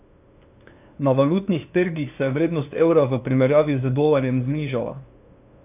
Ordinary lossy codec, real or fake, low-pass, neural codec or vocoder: none; fake; 3.6 kHz; codec, 16 kHz, 2 kbps, FunCodec, trained on LibriTTS, 25 frames a second